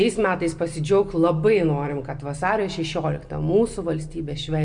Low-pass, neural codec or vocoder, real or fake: 9.9 kHz; none; real